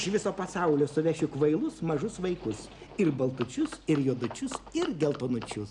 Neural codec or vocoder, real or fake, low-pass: none; real; 10.8 kHz